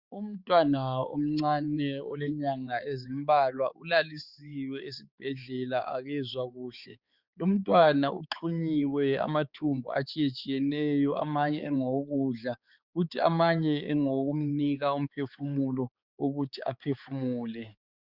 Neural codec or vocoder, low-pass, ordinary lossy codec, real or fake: codec, 16 kHz, 4 kbps, X-Codec, HuBERT features, trained on balanced general audio; 5.4 kHz; Opus, 64 kbps; fake